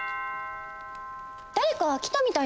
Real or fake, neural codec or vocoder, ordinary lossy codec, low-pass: real; none; none; none